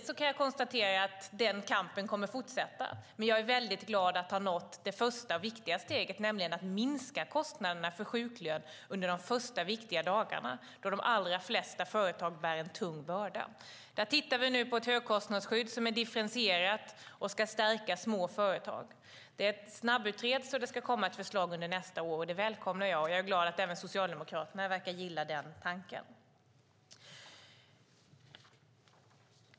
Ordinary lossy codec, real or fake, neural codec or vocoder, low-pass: none; real; none; none